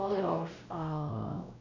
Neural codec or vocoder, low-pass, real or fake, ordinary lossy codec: codec, 16 kHz, 1 kbps, X-Codec, WavLM features, trained on Multilingual LibriSpeech; 7.2 kHz; fake; none